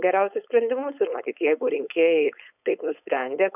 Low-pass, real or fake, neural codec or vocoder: 3.6 kHz; fake; codec, 16 kHz, 4.8 kbps, FACodec